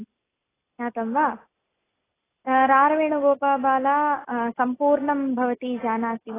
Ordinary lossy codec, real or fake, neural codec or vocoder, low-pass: AAC, 16 kbps; real; none; 3.6 kHz